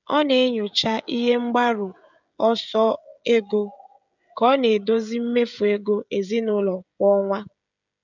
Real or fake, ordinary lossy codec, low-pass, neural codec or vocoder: fake; none; 7.2 kHz; codec, 16 kHz, 16 kbps, FreqCodec, smaller model